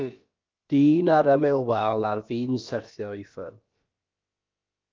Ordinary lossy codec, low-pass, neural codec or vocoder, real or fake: Opus, 24 kbps; 7.2 kHz; codec, 16 kHz, about 1 kbps, DyCAST, with the encoder's durations; fake